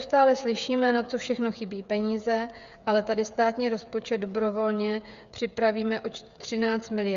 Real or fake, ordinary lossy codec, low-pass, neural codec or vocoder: fake; Opus, 64 kbps; 7.2 kHz; codec, 16 kHz, 8 kbps, FreqCodec, smaller model